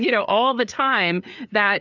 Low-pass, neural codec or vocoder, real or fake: 7.2 kHz; codec, 16 kHz, 4 kbps, FreqCodec, larger model; fake